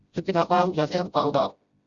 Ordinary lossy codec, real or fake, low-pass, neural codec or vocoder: Opus, 64 kbps; fake; 7.2 kHz; codec, 16 kHz, 0.5 kbps, FreqCodec, smaller model